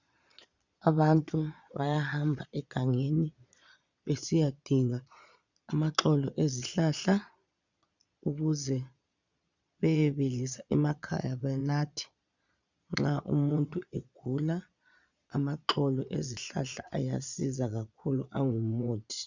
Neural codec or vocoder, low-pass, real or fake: vocoder, 22.05 kHz, 80 mel bands, Vocos; 7.2 kHz; fake